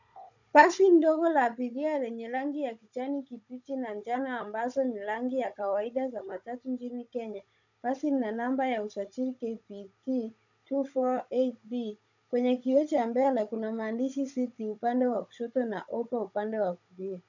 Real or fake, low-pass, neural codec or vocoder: fake; 7.2 kHz; codec, 16 kHz, 16 kbps, FunCodec, trained on Chinese and English, 50 frames a second